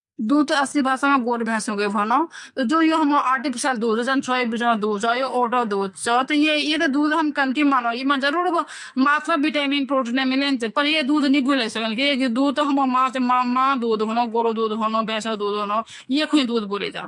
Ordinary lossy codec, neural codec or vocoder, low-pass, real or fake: MP3, 64 kbps; codec, 44.1 kHz, 2.6 kbps, SNAC; 10.8 kHz; fake